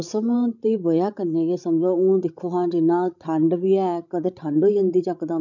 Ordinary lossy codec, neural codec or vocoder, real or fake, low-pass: none; codec, 16 kHz, 16 kbps, FreqCodec, larger model; fake; 7.2 kHz